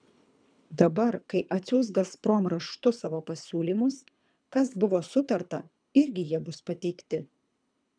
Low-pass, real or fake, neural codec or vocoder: 9.9 kHz; fake; codec, 24 kHz, 3 kbps, HILCodec